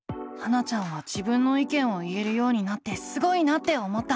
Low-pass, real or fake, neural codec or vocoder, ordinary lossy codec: none; real; none; none